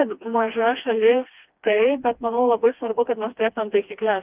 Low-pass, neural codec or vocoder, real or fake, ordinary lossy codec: 3.6 kHz; codec, 16 kHz, 2 kbps, FreqCodec, smaller model; fake; Opus, 24 kbps